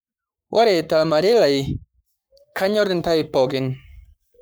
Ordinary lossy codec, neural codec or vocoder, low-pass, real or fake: none; codec, 44.1 kHz, 7.8 kbps, Pupu-Codec; none; fake